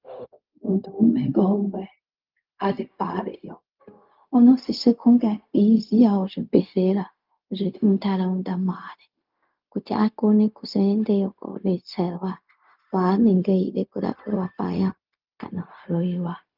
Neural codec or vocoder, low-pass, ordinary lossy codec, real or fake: codec, 16 kHz, 0.4 kbps, LongCat-Audio-Codec; 5.4 kHz; Opus, 24 kbps; fake